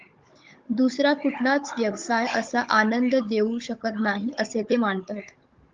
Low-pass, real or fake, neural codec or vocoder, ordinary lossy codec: 7.2 kHz; fake; codec, 16 kHz, 16 kbps, FunCodec, trained on Chinese and English, 50 frames a second; Opus, 32 kbps